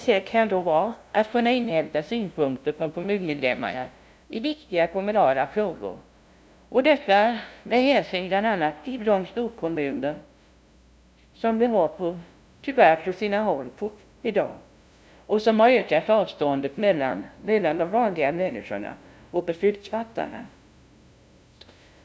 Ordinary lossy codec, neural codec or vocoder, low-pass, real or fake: none; codec, 16 kHz, 0.5 kbps, FunCodec, trained on LibriTTS, 25 frames a second; none; fake